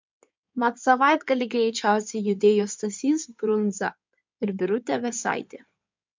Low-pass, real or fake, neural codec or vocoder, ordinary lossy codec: 7.2 kHz; fake; codec, 16 kHz in and 24 kHz out, 2.2 kbps, FireRedTTS-2 codec; MP3, 64 kbps